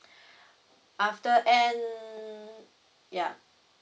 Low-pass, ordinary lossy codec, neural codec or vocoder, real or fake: none; none; none; real